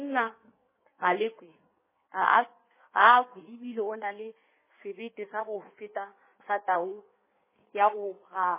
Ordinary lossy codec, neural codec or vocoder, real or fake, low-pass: MP3, 16 kbps; codec, 16 kHz in and 24 kHz out, 1.1 kbps, FireRedTTS-2 codec; fake; 3.6 kHz